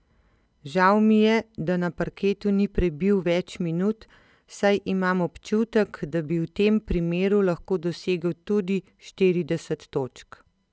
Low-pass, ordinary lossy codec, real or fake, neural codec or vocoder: none; none; real; none